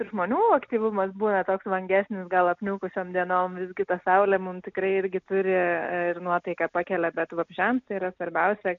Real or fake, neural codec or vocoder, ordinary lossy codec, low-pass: real; none; MP3, 96 kbps; 7.2 kHz